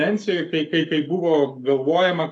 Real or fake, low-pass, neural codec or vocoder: fake; 10.8 kHz; codec, 44.1 kHz, 7.8 kbps, Pupu-Codec